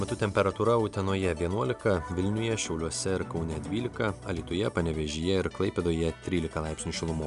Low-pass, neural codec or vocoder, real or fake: 10.8 kHz; none; real